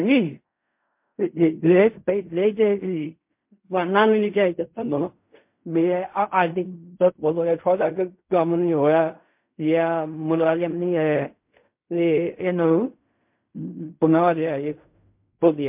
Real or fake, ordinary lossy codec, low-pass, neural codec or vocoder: fake; MP3, 32 kbps; 3.6 kHz; codec, 16 kHz in and 24 kHz out, 0.4 kbps, LongCat-Audio-Codec, fine tuned four codebook decoder